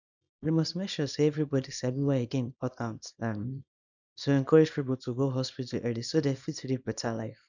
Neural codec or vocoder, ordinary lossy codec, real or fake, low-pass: codec, 24 kHz, 0.9 kbps, WavTokenizer, small release; none; fake; 7.2 kHz